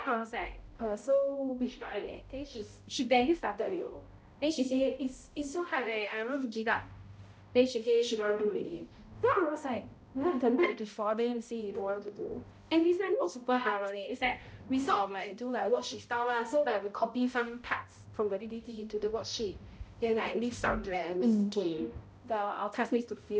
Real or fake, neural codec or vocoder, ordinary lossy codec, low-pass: fake; codec, 16 kHz, 0.5 kbps, X-Codec, HuBERT features, trained on balanced general audio; none; none